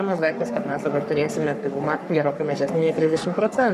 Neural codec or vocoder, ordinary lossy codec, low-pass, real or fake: codec, 44.1 kHz, 3.4 kbps, Pupu-Codec; MP3, 96 kbps; 14.4 kHz; fake